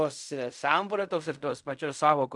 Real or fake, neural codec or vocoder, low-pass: fake; codec, 16 kHz in and 24 kHz out, 0.4 kbps, LongCat-Audio-Codec, fine tuned four codebook decoder; 10.8 kHz